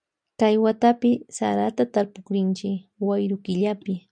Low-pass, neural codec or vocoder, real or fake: 9.9 kHz; none; real